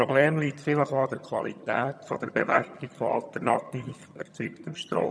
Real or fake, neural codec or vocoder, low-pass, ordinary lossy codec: fake; vocoder, 22.05 kHz, 80 mel bands, HiFi-GAN; none; none